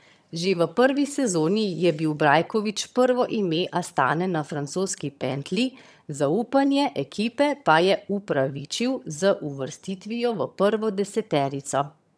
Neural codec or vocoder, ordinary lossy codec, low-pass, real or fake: vocoder, 22.05 kHz, 80 mel bands, HiFi-GAN; none; none; fake